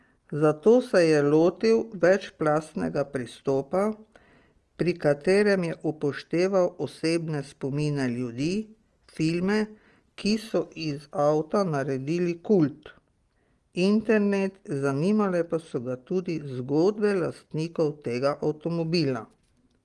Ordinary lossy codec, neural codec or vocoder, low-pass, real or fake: Opus, 24 kbps; none; 10.8 kHz; real